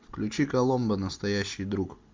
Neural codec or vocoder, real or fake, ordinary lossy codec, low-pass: none; real; MP3, 64 kbps; 7.2 kHz